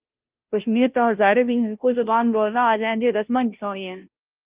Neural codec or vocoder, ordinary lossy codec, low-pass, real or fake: codec, 16 kHz, 0.5 kbps, FunCodec, trained on Chinese and English, 25 frames a second; Opus, 24 kbps; 3.6 kHz; fake